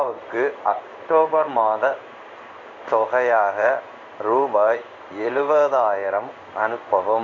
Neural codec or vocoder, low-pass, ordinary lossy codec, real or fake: codec, 16 kHz in and 24 kHz out, 1 kbps, XY-Tokenizer; 7.2 kHz; none; fake